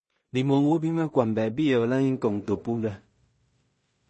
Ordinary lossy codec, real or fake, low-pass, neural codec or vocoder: MP3, 32 kbps; fake; 10.8 kHz; codec, 16 kHz in and 24 kHz out, 0.4 kbps, LongCat-Audio-Codec, two codebook decoder